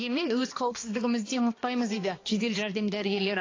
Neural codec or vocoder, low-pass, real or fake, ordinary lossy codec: codec, 16 kHz, 2 kbps, X-Codec, HuBERT features, trained on balanced general audio; 7.2 kHz; fake; AAC, 32 kbps